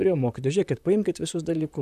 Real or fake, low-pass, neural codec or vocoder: fake; 14.4 kHz; vocoder, 44.1 kHz, 128 mel bands, Pupu-Vocoder